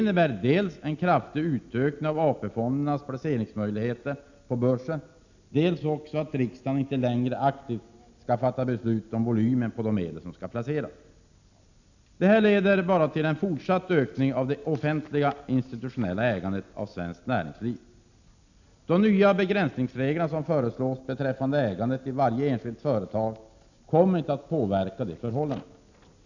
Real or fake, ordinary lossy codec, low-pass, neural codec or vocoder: real; none; 7.2 kHz; none